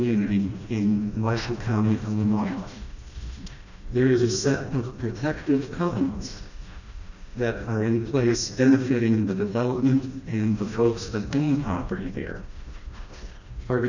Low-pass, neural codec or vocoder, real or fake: 7.2 kHz; codec, 16 kHz, 1 kbps, FreqCodec, smaller model; fake